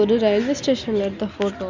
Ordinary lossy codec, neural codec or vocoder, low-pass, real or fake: MP3, 64 kbps; none; 7.2 kHz; real